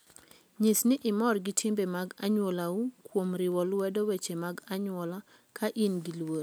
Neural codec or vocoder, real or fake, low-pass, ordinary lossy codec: none; real; none; none